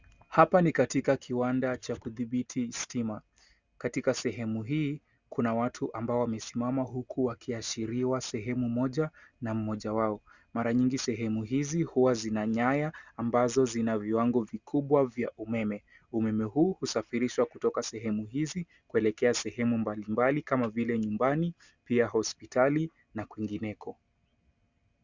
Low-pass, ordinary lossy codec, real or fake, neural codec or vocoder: 7.2 kHz; Opus, 64 kbps; real; none